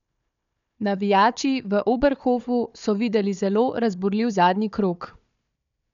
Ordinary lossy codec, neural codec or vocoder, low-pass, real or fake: none; codec, 16 kHz, 4 kbps, FunCodec, trained on Chinese and English, 50 frames a second; 7.2 kHz; fake